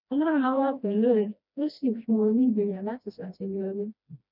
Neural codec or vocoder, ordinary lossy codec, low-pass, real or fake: codec, 16 kHz, 1 kbps, FreqCodec, smaller model; none; 5.4 kHz; fake